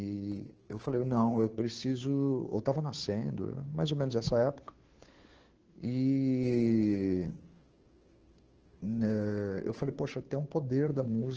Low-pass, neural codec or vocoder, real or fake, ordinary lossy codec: 7.2 kHz; codec, 16 kHz, 2 kbps, FunCodec, trained on Chinese and English, 25 frames a second; fake; Opus, 16 kbps